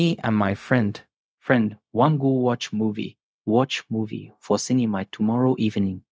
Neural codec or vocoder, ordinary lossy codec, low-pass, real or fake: codec, 16 kHz, 0.4 kbps, LongCat-Audio-Codec; none; none; fake